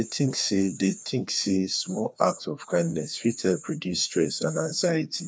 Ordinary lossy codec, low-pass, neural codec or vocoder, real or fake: none; none; codec, 16 kHz, 2 kbps, FreqCodec, larger model; fake